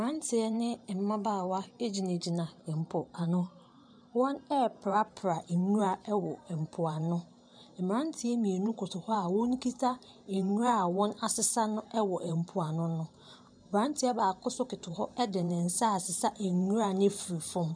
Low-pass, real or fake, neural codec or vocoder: 9.9 kHz; fake; vocoder, 44.1 kHz, 128 mel bands every 512 samples, BigVGAN v2